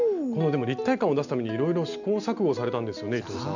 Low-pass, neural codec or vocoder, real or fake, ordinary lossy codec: 7.2 kHz; none; real; none